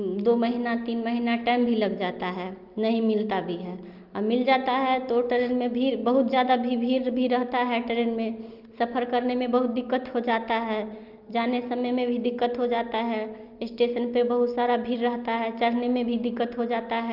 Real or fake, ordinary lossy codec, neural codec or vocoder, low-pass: real; Opus, 24 kbps; none; 5.4 kHz